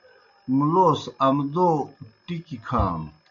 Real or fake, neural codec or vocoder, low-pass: real; none; 7.2 kHz